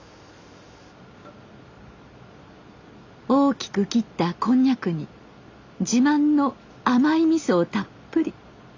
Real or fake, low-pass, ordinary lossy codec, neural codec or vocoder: real; 7.2 kHz; AAC, 48 kbps; none